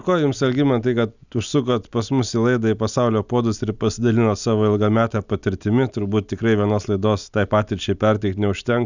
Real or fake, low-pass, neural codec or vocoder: real; 7.2 kHz; none